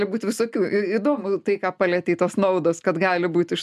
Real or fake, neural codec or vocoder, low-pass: real; none; 14.4 kHz